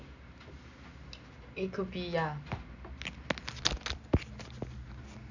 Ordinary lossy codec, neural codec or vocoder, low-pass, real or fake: none; none; 7.2 kHz; real